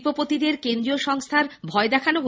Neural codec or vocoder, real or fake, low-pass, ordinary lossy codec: none; real; none; none